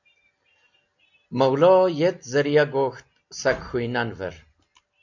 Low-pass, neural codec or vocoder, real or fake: 7.2 kHz; none; real